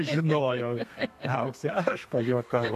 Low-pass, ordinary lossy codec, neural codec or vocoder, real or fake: 14.4 kHz; AAC, 64 kbps; codec, 32 kHz, 1.9 kbps, SNAC; fake